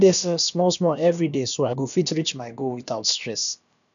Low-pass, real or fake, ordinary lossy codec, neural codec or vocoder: 7.2 kHz; fake; none; codec, 16 kHz, about 1 kbps, DyCAST, with the encoder's durations